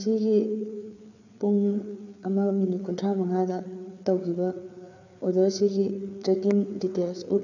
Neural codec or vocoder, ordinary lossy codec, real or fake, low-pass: codec, 16 kHz, 4 kbps, FreqCodec, larger model; none; fake; 7.2 kHz